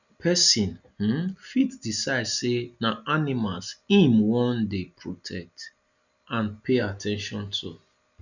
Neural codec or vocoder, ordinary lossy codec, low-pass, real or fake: none; none; 7.2 kHz; real